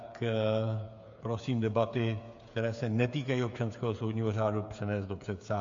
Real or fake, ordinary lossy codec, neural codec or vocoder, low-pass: fake; MP3, 48 kbps; codec, 16 kHz, 8 kbps, FreqCodec, smaller model; 7.2 kHz